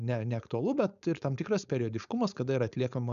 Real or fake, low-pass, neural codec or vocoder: fake; 7.2 kHz; codec, 16 kHz, 4.8 kbps, FACodec